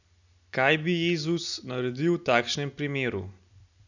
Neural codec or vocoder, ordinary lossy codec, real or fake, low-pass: none; none; real; 7.2 kHz